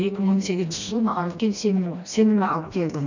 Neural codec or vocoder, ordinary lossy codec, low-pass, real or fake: codec, 16 kHz, 1 kbps, FreqCodec, smaller model; none; 7.2 kHz; fake